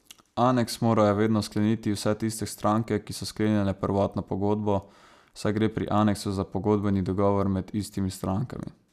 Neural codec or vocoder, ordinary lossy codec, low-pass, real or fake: none; none; 14.4 kHz; real